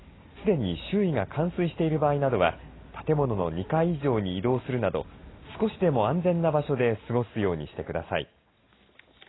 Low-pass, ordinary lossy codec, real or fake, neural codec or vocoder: 7.2 kHz; AAC, 16 kbps; real; none